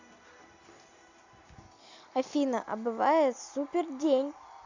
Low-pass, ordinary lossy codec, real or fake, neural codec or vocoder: 7.2 kHz; AAC, 48 kbps; real; none